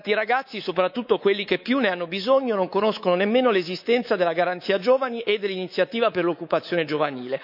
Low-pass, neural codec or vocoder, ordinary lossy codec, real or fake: 5.4 kHz; codec, 24 kHz, 3.1 kbps, DualCodec; none; fake